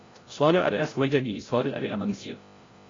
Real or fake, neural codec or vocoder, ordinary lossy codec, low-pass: fake; codec, 16 kHz, 0.5 kbps, FreqCodec, larger model; AAC, 32 kbps; 7.2 kHz